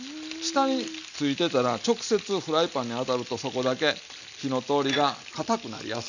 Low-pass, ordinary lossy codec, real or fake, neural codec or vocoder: 7.2 kHz; none; real; none